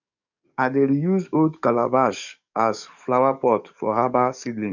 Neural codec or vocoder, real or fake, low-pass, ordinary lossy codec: codec, 44.1 kHz, 7.8 kbps, DAC; fake; 7.2 kHz; none